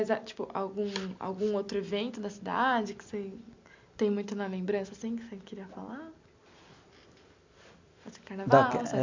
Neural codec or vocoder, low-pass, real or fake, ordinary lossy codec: none; 7.2 kHz; real; MP3, 64 kbps